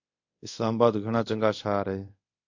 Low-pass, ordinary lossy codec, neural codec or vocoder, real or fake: 7.2 kHz; AAC, 48 kbps; codec, 24 kHz, 0.5 kbps, DualCodec; fake